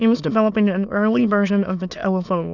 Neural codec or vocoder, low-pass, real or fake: autoencoder, 22.05 kHz, a latent of 192 numbers a frame, VITS, trained on many speakers; 7.2 kHz; fake